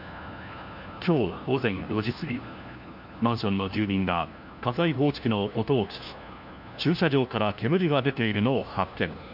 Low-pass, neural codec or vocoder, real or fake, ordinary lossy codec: 5.4 kHz; codec, 16 kHz, 1 kbps, FunCodec, trained on LibriTTS, 50 frames a second; fake; none